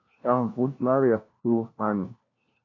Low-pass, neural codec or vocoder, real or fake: 7.2 kHz; codec, 16 kHz, 1 kbps, FunCodec, trained on LibriTTS, 50 frames a second; fake